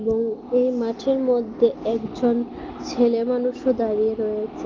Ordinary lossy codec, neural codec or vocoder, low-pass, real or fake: Opus, 24 kbps; none; 7.2 kHz; real